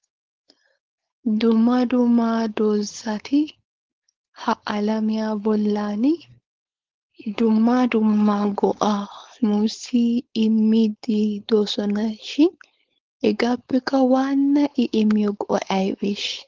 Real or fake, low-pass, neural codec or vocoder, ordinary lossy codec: fake; 7.2 kHz; codec, 16 kHz, 4.8 kbps, FACodec; Opus, 16 kbps